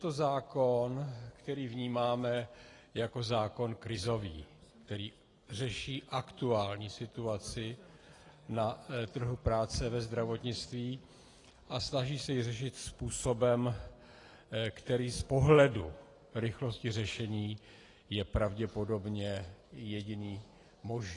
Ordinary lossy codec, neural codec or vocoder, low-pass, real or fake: AAC, 32 kbps; none; 10.8 kHz; real